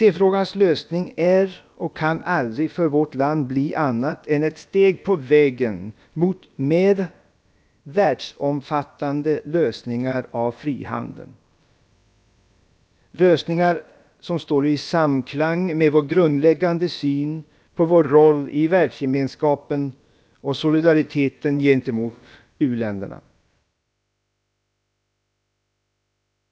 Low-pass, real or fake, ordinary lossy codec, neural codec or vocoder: none; fake; none; codec, 16 kHz, about 1 kbps, DyCAST, with the encoder's durations